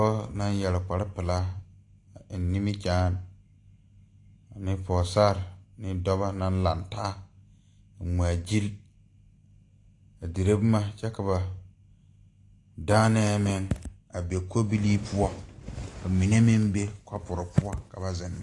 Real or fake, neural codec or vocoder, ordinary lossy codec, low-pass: real; none; AAC, 48 kbps; 10.8 kHz